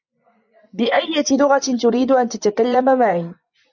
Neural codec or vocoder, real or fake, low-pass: vocoder, 24 kHz, 100 mel bands, Vocos; fake; 7.2 kHz